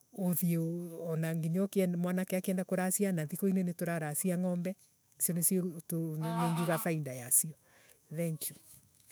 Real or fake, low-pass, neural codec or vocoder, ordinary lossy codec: fake; none; autoencoder, 48 kHz, 128 numbers a frame, DAC-VAE, trained on Japanese speech; none